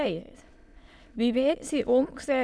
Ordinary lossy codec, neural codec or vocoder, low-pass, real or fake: none; autoencoder, 22.05 kHz, a latent of 192 numbers a frame, VITS, trained on many speakers; none; fake